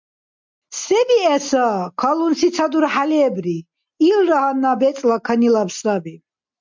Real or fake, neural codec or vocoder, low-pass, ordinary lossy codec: real; none; 7.2 kHz; MP3, 64 kbps